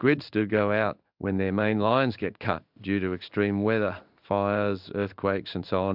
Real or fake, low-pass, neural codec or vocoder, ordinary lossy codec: fake; 5.4 kHz; codec, 16 kHz in and 24 kHz out, 1 kbps, XY-Tokenizer; AAC, 48 kbps